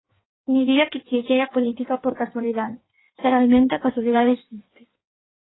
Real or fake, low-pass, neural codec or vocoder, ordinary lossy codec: fake; 7.2 kHz; codec, 16 kHz in and 24 kHz out, 1.1 kbps, FireRedTTS-2 codec; AAC, 16 kbps